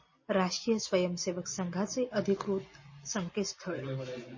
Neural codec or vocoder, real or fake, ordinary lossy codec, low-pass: none; real; MP3, 32 kbps; 7.2 kHz